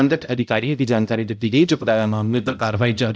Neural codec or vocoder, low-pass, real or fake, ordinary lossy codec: codec, 16 kHz, 0.5 kbps, X-Codec, HuBERT features, trained on balanced general audio; none; fake; none